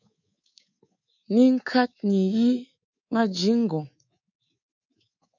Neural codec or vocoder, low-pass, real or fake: codec, 24 kHz, 3.1 kbps, DualCodec; 7.2 kHz; fake